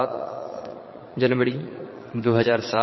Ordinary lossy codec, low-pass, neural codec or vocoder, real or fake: MP3, 24 kbps; 7.2 kHz; vocoder, 22.05 kHz, 80 mel bands, Vocos; fake